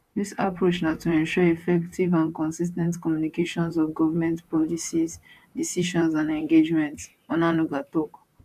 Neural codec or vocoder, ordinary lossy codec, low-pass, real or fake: vocoder, 44.1 kHz, 128 mel bands, Pupu-Vocoder; AAC, 96 kbps; 14.4 kHz; fake